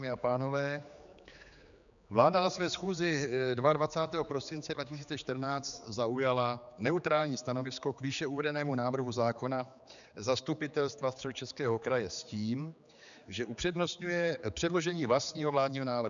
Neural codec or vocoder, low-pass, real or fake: codec, 16 kHz, 4 kbps, X-Codec, HuBERT features, trained on general audio; 7.2 kHz; fake